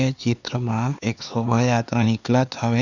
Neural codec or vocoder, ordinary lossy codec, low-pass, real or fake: codec, 16 kHz, 4 kbps, X-Codec, HuBERT features, trained on LibriSpeech; none; 7.2 kHz; fake